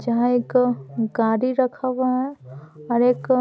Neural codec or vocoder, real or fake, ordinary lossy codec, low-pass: none; real; none; none